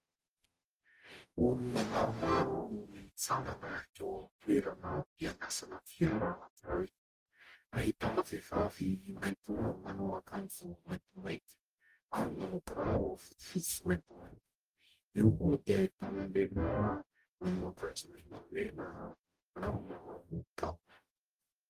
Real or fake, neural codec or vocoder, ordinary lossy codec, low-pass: fake; codec, 44.1 kHz, 0.9 kbps, DAC; Opus, 32 kbps; 14.4 kHz